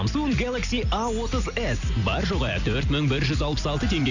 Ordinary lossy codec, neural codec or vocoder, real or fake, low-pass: none; none; real; 7.2 kHz